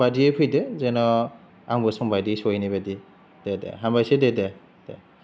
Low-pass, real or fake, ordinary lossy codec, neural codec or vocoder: none; real; none; none